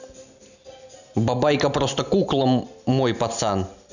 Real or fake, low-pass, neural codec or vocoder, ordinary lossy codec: real; 7.2 kHz; none; none